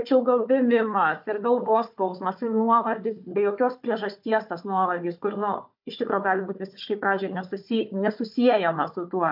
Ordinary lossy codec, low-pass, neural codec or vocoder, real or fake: MP3, 48 kbps; 5.4 kHz; codec, 16 kHz, 4 kbps, FunCodec, trained on Chinese and English, 50 frames a second; fake